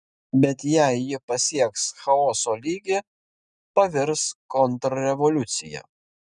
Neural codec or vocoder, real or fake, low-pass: none; real; 9.9 kHz